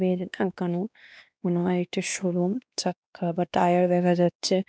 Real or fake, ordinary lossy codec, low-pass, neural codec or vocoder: fake; none; none; codec, 16 kHz, 2 kbps, X-Codec, WavLM features, trained on Multilingual LibriSpeech